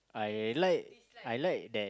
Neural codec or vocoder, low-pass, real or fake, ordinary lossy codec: none; none; real; none